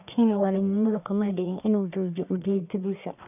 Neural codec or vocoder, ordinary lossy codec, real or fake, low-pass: codec, 44.1 kHz, 1.7 kbps, Pupu-Codec; none; fake; 3.6 kHz